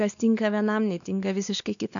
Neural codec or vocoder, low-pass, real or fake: codec, 16 kHz, 2 kbps, X-Codec, WavLM features, trained on Multilingual LibriSpeech; 7.2 kHz; fake